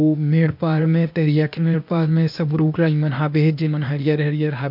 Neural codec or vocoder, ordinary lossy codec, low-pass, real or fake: codec, 16 kHz, 0.8 kbps, ZipCodec; MP3, 48 kbps; 5.4 kHz; fake